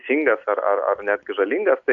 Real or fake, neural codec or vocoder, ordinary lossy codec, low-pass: real; none; Opus, 64 kbps; 7.2 kHz